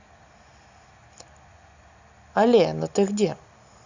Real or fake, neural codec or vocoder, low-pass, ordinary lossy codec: real; none; 7.2 kHz; Opus, 64 kbps